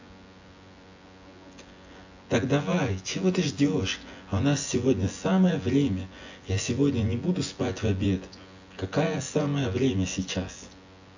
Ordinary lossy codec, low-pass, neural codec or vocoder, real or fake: AAC, 48 kbps; 7.2 kHz; vocoder, 24 kHz, 100 mel bands, Vocos; fake